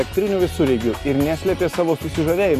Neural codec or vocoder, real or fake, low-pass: none; real; 14.4 kHz